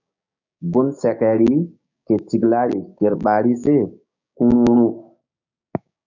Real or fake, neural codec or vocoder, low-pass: fake; codec, 16 kHz, 6 kbps, DAC; 7.2 kHz